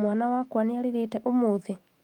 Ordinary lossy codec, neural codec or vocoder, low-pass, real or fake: Opus, 32 kbps; autoencoder, 48 kHz, 128 numbers a frame, DAC-VAE, trained on Japanese speech; 19.8 kHz; fake